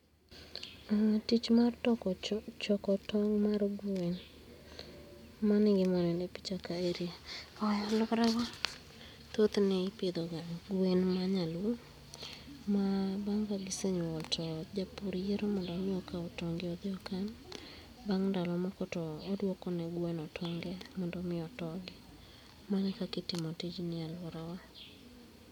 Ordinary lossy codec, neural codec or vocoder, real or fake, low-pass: none; none; real; 19.8 kHz